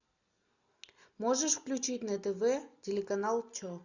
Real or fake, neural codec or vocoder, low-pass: real; none; 7.2 kHz